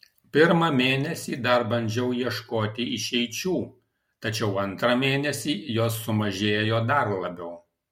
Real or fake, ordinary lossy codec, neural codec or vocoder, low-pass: real; MP3, 64 kbps; none; 19.8 kHz